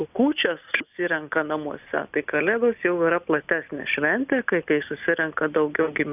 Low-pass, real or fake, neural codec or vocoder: 3.6 kHz; real; none